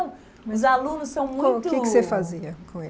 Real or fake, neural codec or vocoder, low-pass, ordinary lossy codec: real; none; none; none